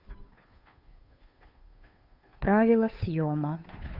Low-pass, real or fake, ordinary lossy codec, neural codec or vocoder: 5.4 kHz; fake; Opus, 64 kbps; codec, 16 kHz, 2 kbps, FunCodec, trained on Chinese and English, 25 frames a second